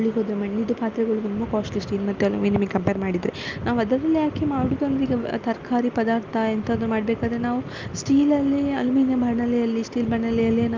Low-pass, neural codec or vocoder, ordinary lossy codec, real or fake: 7.2 kHz; none; Opus, 32 kbps; real